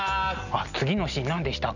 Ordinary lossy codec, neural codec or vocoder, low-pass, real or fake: none; none; 7.2 kHz; real